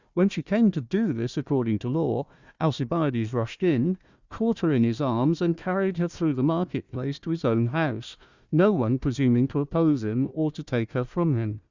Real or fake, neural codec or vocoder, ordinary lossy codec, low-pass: fake; codec, 16 kHz, 1 kbps, FunCodec, trained on Chinese and English, 50 frames a second; Opus, 64 kbps; 7.2 kHz